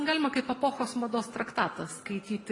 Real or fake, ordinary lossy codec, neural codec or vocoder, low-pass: real; AAC, 32 kbps; none; 10.8 kHz